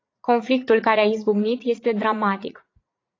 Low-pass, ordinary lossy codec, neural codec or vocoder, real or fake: 7.2 kHz; AAC, 32 kbps; vocoder, 44.1 kHz, 80 mel bands, Vocos; fake